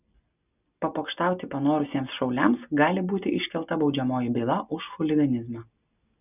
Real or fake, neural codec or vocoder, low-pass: real; none; 3.6 kHz